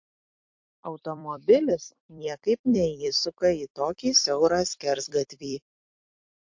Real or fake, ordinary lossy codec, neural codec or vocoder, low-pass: fake; MP3, 48 kbps; vocoder, 44.1 kHz, 128 mel bands every 256 samples, BigVGAN v2; 7.2 kHz